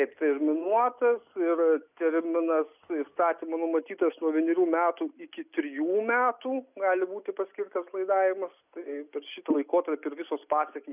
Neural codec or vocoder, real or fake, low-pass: none; real; 3.6 kHz